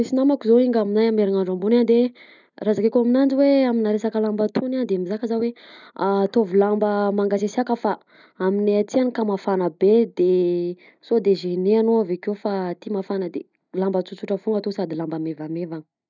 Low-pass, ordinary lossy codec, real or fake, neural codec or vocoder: 7.2 kHz; none; real; none